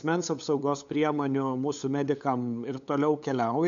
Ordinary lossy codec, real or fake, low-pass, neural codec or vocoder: AAC, 64 kbps; fake; 7.2 kHz; codec, 16 kHz, 8 kbps, FunCodec, trained on LibriTTS, 25 frames a second